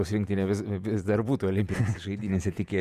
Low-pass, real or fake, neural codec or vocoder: 14.4 kHz; fake; vocoder, 48 kHz, 128 mel bands, Vocos